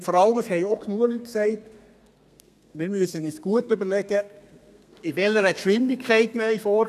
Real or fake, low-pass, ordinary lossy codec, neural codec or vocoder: fake; 14.4 kHz; none; codec, 32 kHz, 1.9 kbps, SNAC